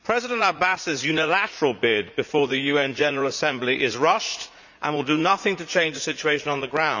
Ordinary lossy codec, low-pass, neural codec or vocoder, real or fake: none; 7.2 kHz; vocoder, 44.1 kHz, 80 mel bands, Vocos; fake